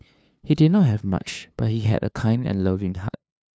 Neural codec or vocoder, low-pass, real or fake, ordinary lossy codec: codec, 16 kHz, 2 kbps, FunCodec, trained on LibriTTS, 25 frames a second; none; fake; none